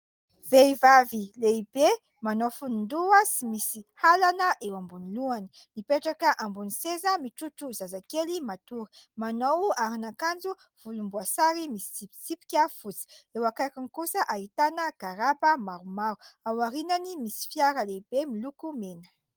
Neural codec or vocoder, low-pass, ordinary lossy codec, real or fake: none; 19.8 kHz; Opus, 24 kbps; real